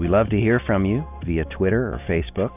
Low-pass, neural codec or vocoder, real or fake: 3.6 kHz; none; real